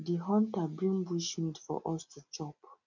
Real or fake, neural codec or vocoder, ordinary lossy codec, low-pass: real; none; none; 7.2 kHz